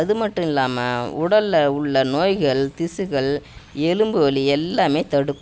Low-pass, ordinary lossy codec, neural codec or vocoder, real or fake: none; none; none; real